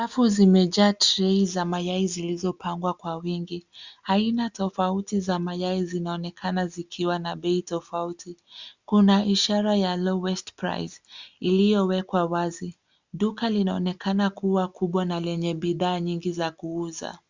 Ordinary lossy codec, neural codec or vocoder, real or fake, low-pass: Opus, 64 kbps; none; real; 7.2 kHz